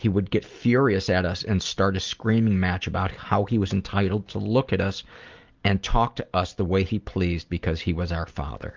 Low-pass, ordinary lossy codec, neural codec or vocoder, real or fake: 7.2 kHz; Opus, 32 kbps; none; real